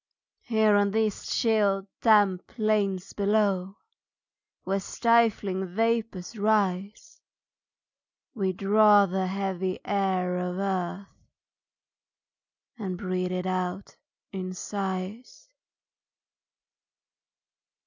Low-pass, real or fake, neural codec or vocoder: 7.2 kHz; real; none